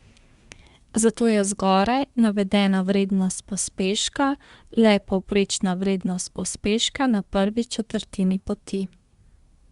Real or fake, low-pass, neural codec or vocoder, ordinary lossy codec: fake; 10.8 kHz; codec, 24 kHz, 1 kbps, SNAC; Opus, 64 kbps